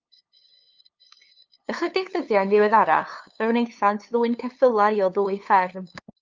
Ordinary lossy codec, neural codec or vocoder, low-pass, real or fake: Opus, 24 kbps; codec, 16 kHz, 2 kbps, FunCodec, trained on LibriTTS, 25 frames a second; 7.2 kHz; fake